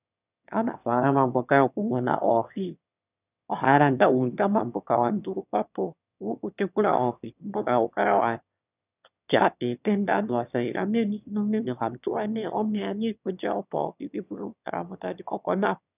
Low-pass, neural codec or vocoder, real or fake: 3.6 kHz; autoencoder, 22.05 kHz, a latent of 192 numbers a frame, VITS, trained on one speaker; fake